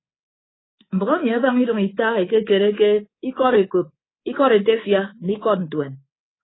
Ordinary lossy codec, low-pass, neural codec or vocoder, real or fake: AAC, 16 kbps; 7.2 kHz; codec, 24 kHz, 3.1 kbps, DualCodec; fake